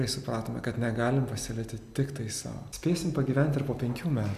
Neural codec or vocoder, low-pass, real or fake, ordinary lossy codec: none; 14.4 kHz; real; AAC, 96 kbps